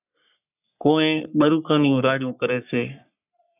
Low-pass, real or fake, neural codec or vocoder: 3.6 kHz; fake; codec, 44.1 kHz, 3.4 kbps, Pupu-Codec